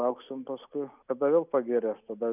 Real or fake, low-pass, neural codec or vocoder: real; 3.6 kHz; none